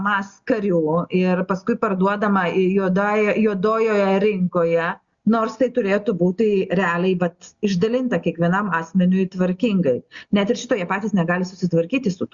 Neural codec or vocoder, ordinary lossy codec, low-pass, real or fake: none; Opus, 64 kbps; 7.2 kHz; real